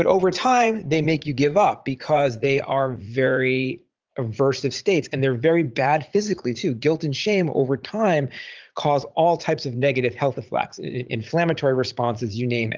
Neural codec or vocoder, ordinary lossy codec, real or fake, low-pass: vocoder, 44.1 kHz, 80 mel bands, Vocos; Opus, 24 kbps; fake; 7.2 kHz